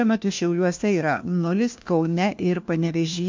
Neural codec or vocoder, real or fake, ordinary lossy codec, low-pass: codec, 16 kHz, 1 kbps, FunCodec, trained on LibriTTS, 50 frames a second; fake; MP3, 48 kbps; 7.2 kHz